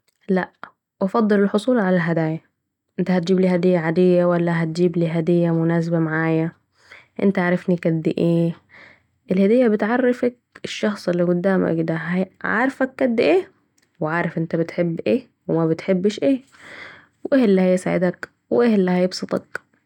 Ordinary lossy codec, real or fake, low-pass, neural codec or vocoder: none; real; 19.8 kHz; none